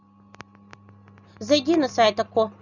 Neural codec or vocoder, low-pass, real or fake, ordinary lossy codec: none; 7.2 kHz; real; none